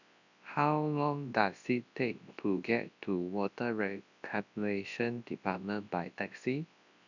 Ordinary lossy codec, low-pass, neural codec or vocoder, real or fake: none; 7.2 kHz; codec, 24 kHz, 0.9 kbps, WavTokenizer, large speech release; fake